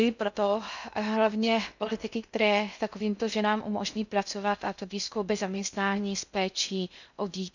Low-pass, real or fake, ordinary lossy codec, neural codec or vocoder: 7.2 kHz; fake; none; codec, 16 kHz in and 24 kHz out, 0.6 kbps, FocalCodec, streaming, 2048 codes